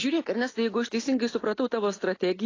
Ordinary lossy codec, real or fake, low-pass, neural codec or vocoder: AAC, 32 kbps; real; 7.2 kHz; none